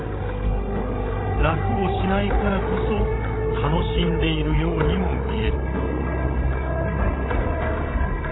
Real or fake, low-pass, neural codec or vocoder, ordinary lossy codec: fake; 7.2 kHz; codec, 16 kHz, 16 kbps, FreqCodec, larger model; AAC, 16 kbps